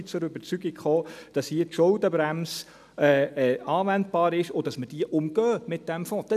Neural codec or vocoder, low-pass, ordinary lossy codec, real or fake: none; 14.4 kHz; none; real